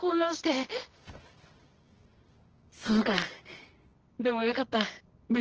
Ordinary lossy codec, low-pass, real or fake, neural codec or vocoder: Opus, 16 kbps; 7.2 kHz; fake; codec, 24 kHz, 0.9 kbps, WavTokenizer, medium music audio release